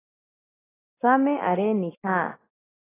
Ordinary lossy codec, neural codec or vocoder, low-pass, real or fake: AAC, 16 kbps; none; 3.6 kHz; real